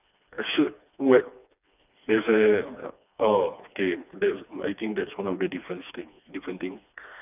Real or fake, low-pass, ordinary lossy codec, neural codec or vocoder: fake; 3.6 kHz; none; codec, 16 kHz, 2 kbps, FreqCodec, smaller model